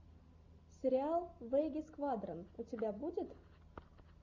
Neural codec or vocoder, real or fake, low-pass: none; real; 7.2 kHz